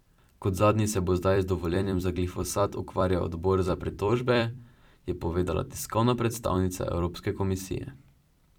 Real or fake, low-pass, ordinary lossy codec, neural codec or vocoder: fake; 19.8 kHz; none; vocoder, 44.1 kHz, 128 mel bands every 256 samples, BigVGAN v2